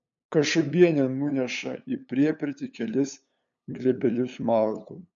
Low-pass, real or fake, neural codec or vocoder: 7.2 kHz; fake; codec, 16 kHz, 8 kbps, FunCodec, trained on LibriTTS, 25 frames a second